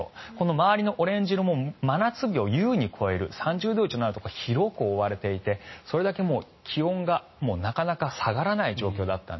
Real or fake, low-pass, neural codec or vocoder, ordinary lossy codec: real; 7.2 kHz; none; MP3, 24 kbps